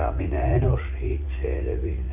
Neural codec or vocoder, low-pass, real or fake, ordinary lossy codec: vocoder, 44.1 kHz, 80 mel bands, Vocos; 3.6 kHz; fake; none